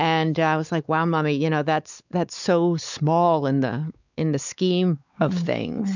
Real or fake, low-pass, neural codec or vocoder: fake; 7.2 kHz; codec, 16 kHz, 4 kbps, X-Codec, WavLM features, trained on Multilingual LibriSpeech